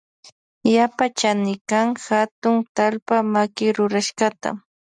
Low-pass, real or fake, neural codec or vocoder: 9.9 kHz; real; none